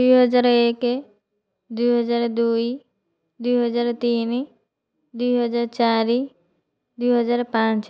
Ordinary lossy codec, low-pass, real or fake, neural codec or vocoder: none; none; real; none